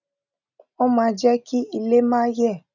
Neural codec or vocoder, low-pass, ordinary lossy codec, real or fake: none; 7.2 kHz; none; real